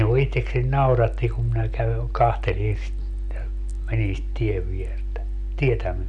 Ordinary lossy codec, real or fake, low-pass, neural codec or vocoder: none; real; 10.8 kHz; none